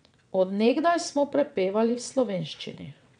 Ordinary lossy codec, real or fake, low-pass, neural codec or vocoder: none; fake; 9.9 kHz; vocoder, 22.05 kHz, 80 mel bands, WaveNeXt